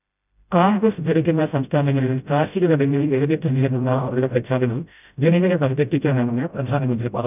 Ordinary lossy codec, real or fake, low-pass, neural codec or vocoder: none; fake; 3.6 kHz; codec, 16 kHz, 0.5 kbps, FreqCodec, smaller model